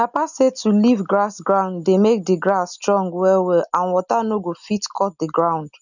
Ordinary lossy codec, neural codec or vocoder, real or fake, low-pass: none; none; real; 7.2 kHz